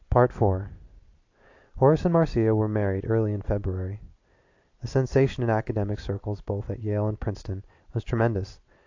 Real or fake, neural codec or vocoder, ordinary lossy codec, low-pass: real; none; AAC, 48 kbps; 7.2 kHz